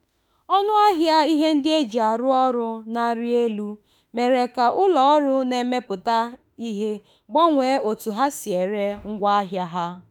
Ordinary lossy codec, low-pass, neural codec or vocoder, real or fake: none; none; autoencoder, 48 kHz, 32 numbers a frame, DAC-VAE, trained on Japanese speech; fake